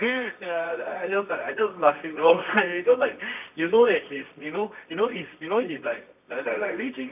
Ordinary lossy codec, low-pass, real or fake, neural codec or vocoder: none; 3.6 kHz; fake; codec, 24 kHz, 0.9 kbps, WavTokenizer, medium music audio release